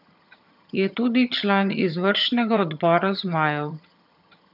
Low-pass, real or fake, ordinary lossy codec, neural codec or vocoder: 5.4 kHz; fake; none; vocoder, 22.05 kHz, 80 mel bands, HiFi-GAN